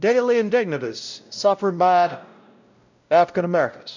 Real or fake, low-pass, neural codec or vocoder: fake; 7.2 kHz; codec, 16 kHz, 0.5 kbps, X-Codec, WavLM features, trained on Multilingual LibriSpeech